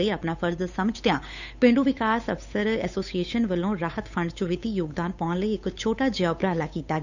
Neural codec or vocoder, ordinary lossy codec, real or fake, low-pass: codec, 16 kHz, 8 kbps, FunCodec, trained on Chinese and English, 25 frames a second; none; fake; 7.2 kHz